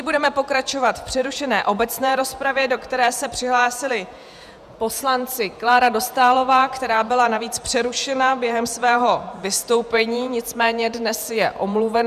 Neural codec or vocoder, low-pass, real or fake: vocoder, 48 kHz, 128 mel bands, Vocos; 14.4 kHz; fake